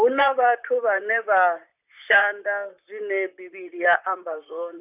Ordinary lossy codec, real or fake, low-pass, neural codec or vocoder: none; fake; 3.6 kHz; vocoder, 44.1 kHz, 128 mel bands, Pupu-Vocoder